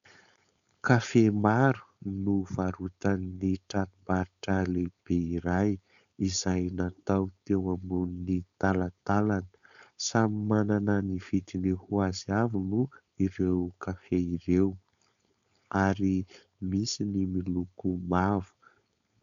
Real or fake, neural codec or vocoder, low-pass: fake; codec, 16 kHz, 4.8 kbps, FACodec; 7.2 kHz